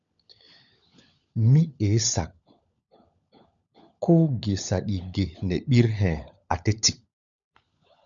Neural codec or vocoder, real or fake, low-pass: codec, 16 kHz, 16 kbps, FunCodec, trained on LibriTTS, 50 frames a second; fake; 7.2 kHz